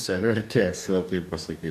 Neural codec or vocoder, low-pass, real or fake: codec, 44.1 kHz, 2.6 kbps, DAC; 14.4 kHz; fake